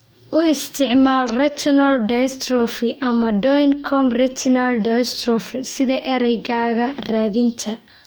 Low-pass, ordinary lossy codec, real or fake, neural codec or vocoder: none; none; fake; codec, 44.1 kHz, 2.6 kbps, DAC